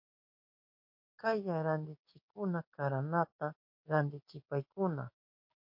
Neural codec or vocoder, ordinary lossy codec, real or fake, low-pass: codec, 16 kHz, 6 kbps, DAC; MP3, 32 kbps; fake; 5.4 kHz